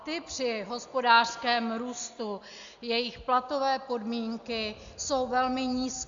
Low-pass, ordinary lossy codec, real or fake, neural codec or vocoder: 7.2 kHz; Opus, 64 kbps; real; none